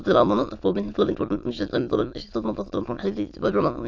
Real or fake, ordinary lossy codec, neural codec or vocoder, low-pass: fake; AAC, 48 kbps; autoencoder, 22.05 kHz, a latent of 192 numbers a frame, VITS, trained on many speakers; 7.2 kHz